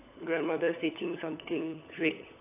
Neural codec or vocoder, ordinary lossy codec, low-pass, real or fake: codec, 16 kHz, 16 kbps, FunCodec, trained on LibriTTS, 50 frames a second; AAC, 24 kbps; 3.6 kHz; fake